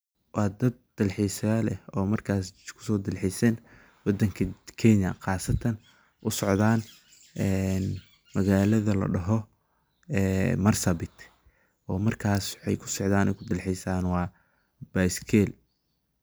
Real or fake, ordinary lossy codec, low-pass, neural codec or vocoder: real; none; none; none